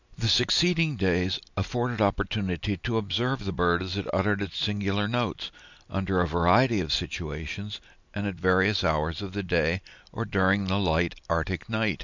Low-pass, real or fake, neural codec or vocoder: 7.2 kHz; real; none